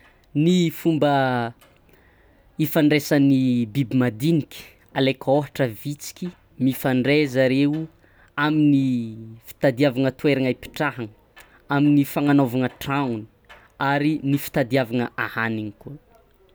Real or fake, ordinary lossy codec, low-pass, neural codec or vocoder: real; none; none; none